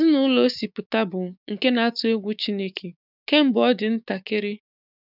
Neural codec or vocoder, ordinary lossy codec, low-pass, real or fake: codec, 16 kHz, 6 kbps, DAC; none; 5.4 kHz; fake